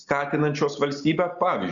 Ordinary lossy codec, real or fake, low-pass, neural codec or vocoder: Opus, 64 kbps; real; 7.2 kHz; none